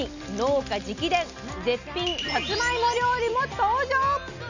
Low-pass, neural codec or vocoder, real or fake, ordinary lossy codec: 7.2 kHz; none; real; none